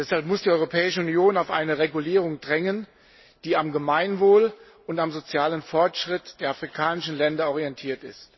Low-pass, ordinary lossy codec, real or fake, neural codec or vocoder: 7.2 kHz; MP3, 24 kbps; real; none